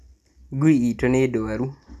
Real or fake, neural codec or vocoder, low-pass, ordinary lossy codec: real; none; 14.4 kHz; none